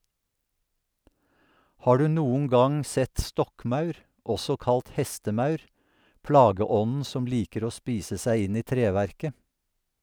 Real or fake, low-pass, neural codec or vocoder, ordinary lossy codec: real; none; none; none